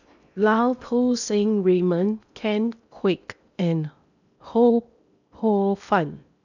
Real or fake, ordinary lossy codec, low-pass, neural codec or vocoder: fake; none; 7.2 kHz; codec, 16 kHz in and 24 kHz out, 0.8 kbps, FocalCodec, streaming, 65536 codes